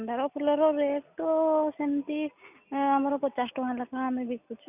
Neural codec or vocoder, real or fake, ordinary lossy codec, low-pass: none; real; none; 3.6 kHz